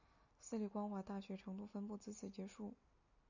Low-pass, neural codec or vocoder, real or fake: 7.2 kHz; none; real